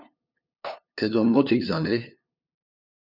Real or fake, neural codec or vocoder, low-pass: fake; codec, 16 kHz, 2 kbps, FunCodec, trained on LibriTTS, 25 frames a second; 5.4 kHz